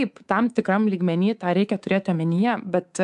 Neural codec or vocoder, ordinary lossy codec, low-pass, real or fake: codec, 24 kHz, 3.1 kbps, DualCodec; AAC, 96 kbps; 10.8 kHz; fake